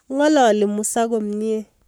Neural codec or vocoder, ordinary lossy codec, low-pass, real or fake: codec, 44.1 kHz, 7.8 kbps, Pupu-Codec; none; none; fake